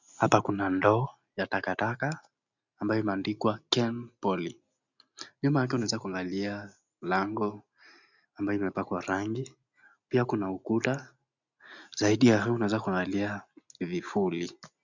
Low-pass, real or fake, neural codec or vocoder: 7.2 kHz; real; none